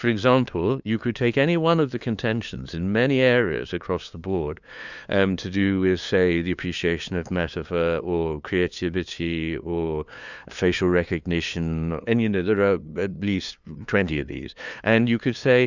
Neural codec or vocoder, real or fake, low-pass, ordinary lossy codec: codec, 16 kHz, 2 kbps, FunCodec, trained on LibriTTS, 25 frames a second; fake; 7.2 kHz; Opus, 64 kbps